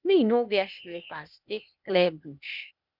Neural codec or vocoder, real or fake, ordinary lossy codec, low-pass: codec, 16 kHz, 0.8 kbps, ZipCodec; fake; none; 5.4 kHz